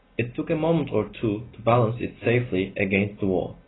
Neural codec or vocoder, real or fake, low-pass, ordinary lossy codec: none; real; 7.2 kHz; AAC, 16 kbps